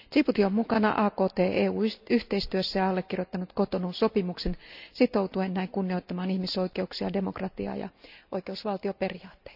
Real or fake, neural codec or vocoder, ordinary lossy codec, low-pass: real; none; none; 5.4 kHz